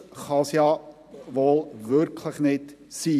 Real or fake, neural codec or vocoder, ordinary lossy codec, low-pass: fake; vocoder, 44.1 kHz, 128 mel bands every 512 samples, BigVGAN v2; none; 14.4 kHz